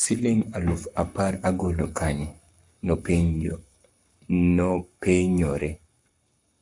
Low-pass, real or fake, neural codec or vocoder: 10.8 kHz; fake; codec, 44.1 kHz, 7.8 kbps, Pupu-Codec